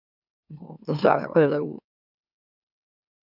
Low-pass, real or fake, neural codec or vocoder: 5.4 kHz; fake; autoencoder, 44.1 kHz, a latent of 192 numbers a frame, MeloTTS